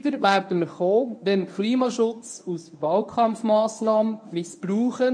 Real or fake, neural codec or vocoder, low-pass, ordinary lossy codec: fake; codec, 24 kHz, 0.9 kbps, WavTokenizer, medium speech release version 1; 9.9 kHz; MP3, 48 kbps